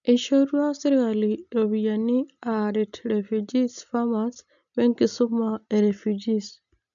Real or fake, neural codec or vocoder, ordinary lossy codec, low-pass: real; none; none; 7.2 kHz